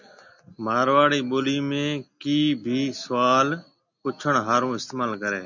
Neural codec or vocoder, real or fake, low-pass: none; real; 7.2 kHz